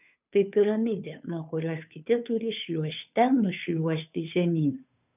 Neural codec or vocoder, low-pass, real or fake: codec, 16 kHz, 2 kbps, FunCodec, trained on Chinese and English, 25 frames a second; 3.6 kHz; fake